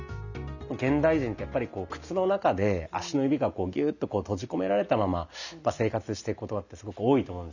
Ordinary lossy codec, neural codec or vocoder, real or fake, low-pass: none; none; real; 7.2 kHz